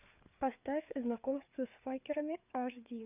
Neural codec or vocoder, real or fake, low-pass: codec, 16 kHz, 4 kbps, FreqCodec, larger model; fake; 3.6 kHz